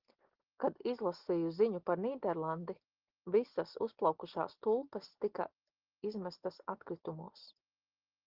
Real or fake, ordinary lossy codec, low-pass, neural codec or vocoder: real; Opus, 16 kbps; 5.4 kHz; none